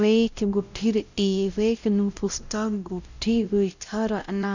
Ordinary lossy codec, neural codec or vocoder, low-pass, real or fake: none; codec, 16 kHz, 0.5 kbps, X-Codec, WavLM features, trained on Multilingual LibriSpeech; 7.2 kHz; fake